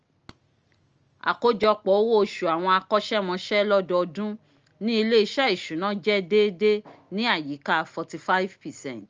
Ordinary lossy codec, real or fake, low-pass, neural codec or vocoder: Opus, 24 kbps; real; 7.2 kHz; none